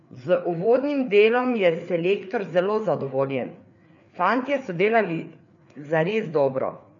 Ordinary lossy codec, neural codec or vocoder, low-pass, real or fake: none; codec, 16 kHz, 4 kbps, FreqCodec, larger model; 7.2 kHz; fake